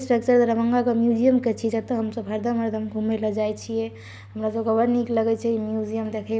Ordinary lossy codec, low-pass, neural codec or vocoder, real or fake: none; none; none; real